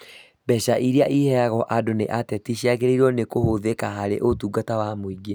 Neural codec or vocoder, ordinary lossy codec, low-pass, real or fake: none; none; none; real